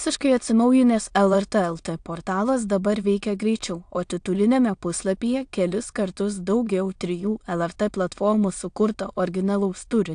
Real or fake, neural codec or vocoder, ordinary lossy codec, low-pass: fake; autoencoder, 22.05 kHz, a latent of 192 numbers a frame, VITS, trained on many speakers; AAC, 64 kbps; 9.9 kHz